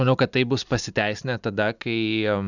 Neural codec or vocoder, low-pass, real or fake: none; 7.2 kHz; real